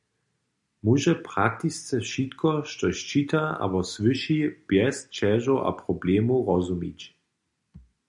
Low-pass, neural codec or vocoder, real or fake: 10.8 kHz; none; real